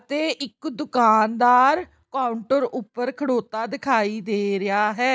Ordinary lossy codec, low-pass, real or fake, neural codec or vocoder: none; none; real; none